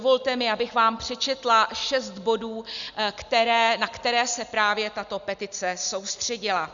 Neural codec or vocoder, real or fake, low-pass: none; real; 7.2 kHz